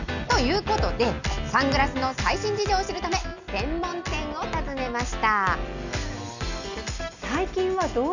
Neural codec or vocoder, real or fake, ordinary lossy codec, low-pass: none; real; none; 7.2 kHz